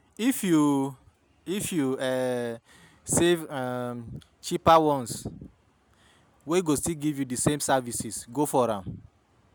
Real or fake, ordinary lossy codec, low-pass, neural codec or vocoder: real; none; none; none